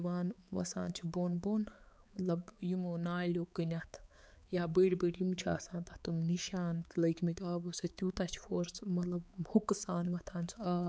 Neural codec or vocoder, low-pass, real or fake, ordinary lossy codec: codec, 16 kHz, 4 kbps, X-Codec, HuBERT features, trained on balanced general audio; none; fake; none